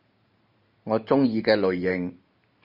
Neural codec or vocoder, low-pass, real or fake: vocoder, 44.1 kHz, 128 mel bands every 512 samples, BigVGAN v2; 5.4 kHz; fake